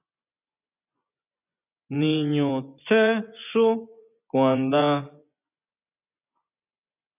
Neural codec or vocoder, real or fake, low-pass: vocoder, 24 kHz, 100 mel bands, Vocos; fake; 3.6 kHz